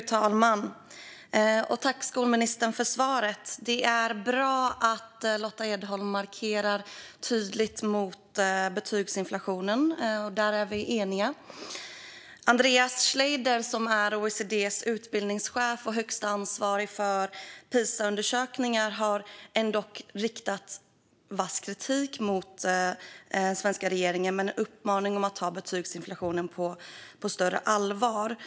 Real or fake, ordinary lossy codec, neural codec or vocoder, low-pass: real; none; none; none